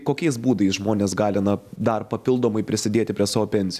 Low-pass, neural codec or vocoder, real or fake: 14.4 kHz; none; real